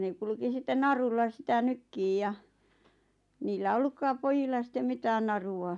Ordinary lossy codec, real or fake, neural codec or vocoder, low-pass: none; real; none; 10.8 kHz